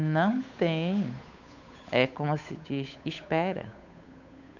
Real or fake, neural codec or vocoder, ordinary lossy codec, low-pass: fake; codec, 16 kHz, 8 kbps, FunCodec, trained on Chinese and English, 25 frames a second; none; 7.2 kHz